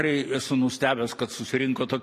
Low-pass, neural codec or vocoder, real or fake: 14.4 kHz; none; real